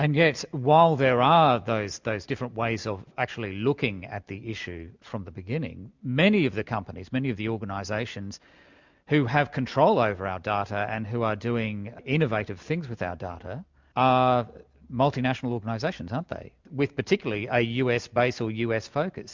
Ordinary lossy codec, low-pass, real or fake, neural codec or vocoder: MP3, 64 kbps; 7.2 kHz; real; none